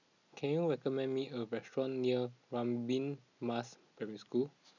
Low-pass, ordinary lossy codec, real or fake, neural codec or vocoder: 7.2 kHz; none; real; none